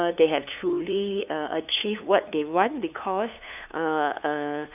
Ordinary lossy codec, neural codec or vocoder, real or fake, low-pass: none; codec, 16 kHz, 2 kbps, FunCodec, trained on LibriTTS, 25 frames a second; fake; 3.6 kHz